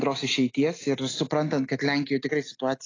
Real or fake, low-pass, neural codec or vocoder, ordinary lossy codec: real; 7.2 kHz; none; AAC, 32 kbps